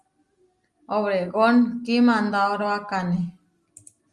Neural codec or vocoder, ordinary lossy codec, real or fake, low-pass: none; Opus, 32 kbps; real; 10.8 kHz